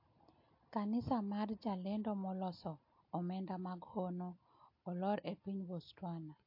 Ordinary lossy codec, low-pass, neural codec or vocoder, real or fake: MP3, 32 kbps; 5.4 kHz; none; real